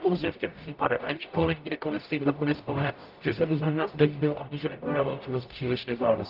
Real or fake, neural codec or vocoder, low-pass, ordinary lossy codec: fake; codec, 44.1 kHz, 0.9 kbps, DAC; 5.4 kHz; Opus, 32 kbps